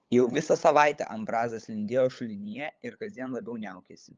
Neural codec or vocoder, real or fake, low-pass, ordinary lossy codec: codec, 16 kHz, 8 kbps, FunCodec, trained on LibriTTS, 25 frames a second; fake; 7.2 kHz; Opus, 24 kbps